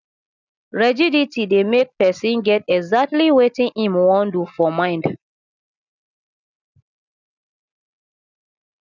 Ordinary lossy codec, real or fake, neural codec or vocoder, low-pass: none; real; none; 7.2 kHz